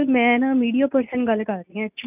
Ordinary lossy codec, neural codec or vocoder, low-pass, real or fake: none; none; 3.6 kHz; real